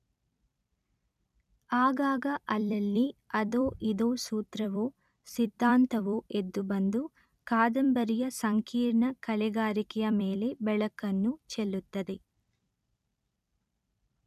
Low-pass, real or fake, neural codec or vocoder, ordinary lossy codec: 14.4 kHz; fake; vocoder, 44.1 kHz, 128 mel bands every 256 samples, BigVGAN v2; none